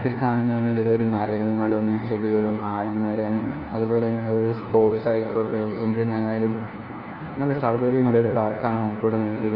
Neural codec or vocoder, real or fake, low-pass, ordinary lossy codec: codec, 16 kHz, 1 kbps, FunCodec, trained on LibriTTS, 50 frames a second; fake; 5.4 kHz; Opus, 24 kbps